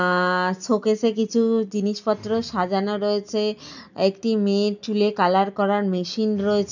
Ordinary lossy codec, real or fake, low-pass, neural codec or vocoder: none; real; 7.2 kHz; none